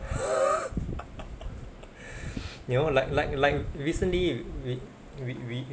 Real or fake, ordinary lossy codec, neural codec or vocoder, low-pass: real; none; none; none